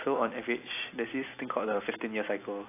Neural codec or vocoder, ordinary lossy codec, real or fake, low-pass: none; AAC, 24 kbps; real; 3.6 kHz